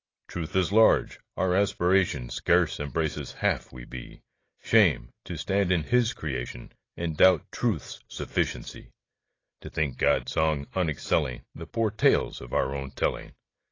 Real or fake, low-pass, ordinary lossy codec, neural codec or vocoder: real; 7.2 kHz; AAC, 32 kbps; none